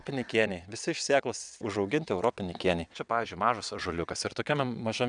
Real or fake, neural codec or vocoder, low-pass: fake; vocoder, 22.05 kHz, 80 mel bands, WaveNeXt; 9.9 kHz